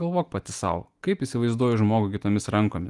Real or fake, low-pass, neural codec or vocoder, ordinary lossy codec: real; 10.8 kHz; none; Opus, 24 kbps